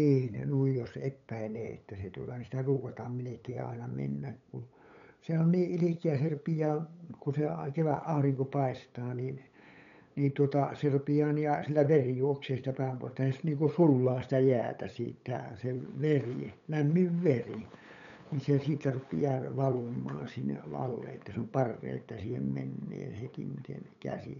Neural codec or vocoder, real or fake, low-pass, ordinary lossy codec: codec, 16 kHz, 8 kbps, FunCodec, trained on LibriTTS, 25 frames a second; fake; 7.2 kHz; none